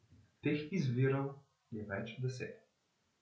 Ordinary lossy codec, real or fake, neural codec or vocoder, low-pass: none; real; none; none